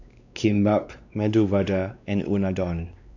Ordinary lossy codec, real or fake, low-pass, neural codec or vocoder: none; fake; 7.2 kHz; codec, 16 kHz, 2 kbps, X-Codec, WavLM features, trained on Multilingual LibriSpeech